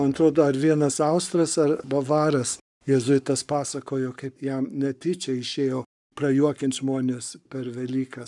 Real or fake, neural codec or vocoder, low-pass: fake; codec, 44.1 kHz, 7.8 kbps, Pupu-Codec; 10.8 kHz